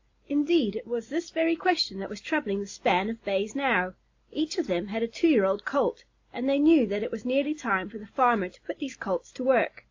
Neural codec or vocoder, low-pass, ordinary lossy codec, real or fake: none; 7.2 kHz; AAC, 48 kbps; real